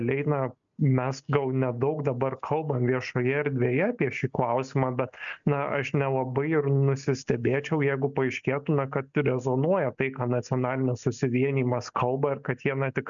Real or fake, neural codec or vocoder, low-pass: real; none; 7.2 kHz